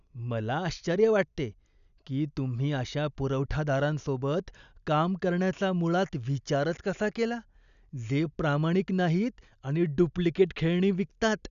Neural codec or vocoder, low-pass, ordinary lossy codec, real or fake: none; 7.2 kHz; none; real